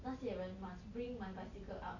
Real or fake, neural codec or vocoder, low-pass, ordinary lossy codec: real; none; 7.2 kHz; none